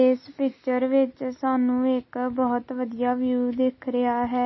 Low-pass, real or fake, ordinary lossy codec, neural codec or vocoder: 7.2 kHz; real; MP3, 24 kbps; none